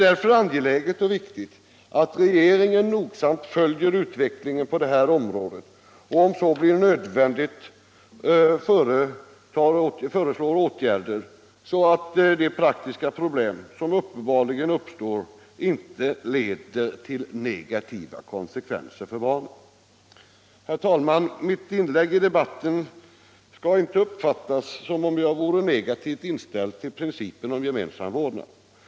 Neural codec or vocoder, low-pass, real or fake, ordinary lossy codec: none; none; real; none